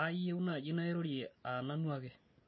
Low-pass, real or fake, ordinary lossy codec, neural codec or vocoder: 7.2 kHz; real; MP3, 24 kbps; none